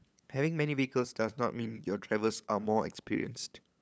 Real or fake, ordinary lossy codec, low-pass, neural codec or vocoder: fake; none; none; codec, 16 kHz, 8 kbps, FunCodec, trained on LibriTTS, 25 frames a second